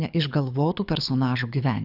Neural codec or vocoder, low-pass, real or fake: codec, 24 kHz, 6 kbps, HILCodec; 5.4 kHz; fake